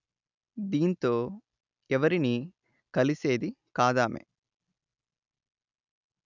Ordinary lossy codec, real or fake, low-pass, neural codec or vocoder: none; real; 7.2 kHz; none